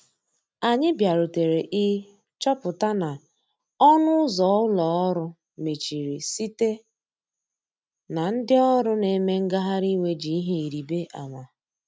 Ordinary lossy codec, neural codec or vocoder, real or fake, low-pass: none; none; real; none